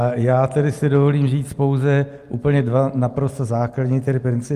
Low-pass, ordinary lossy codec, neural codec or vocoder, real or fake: 14.4 kHz; Opus, 32 kbps; none; real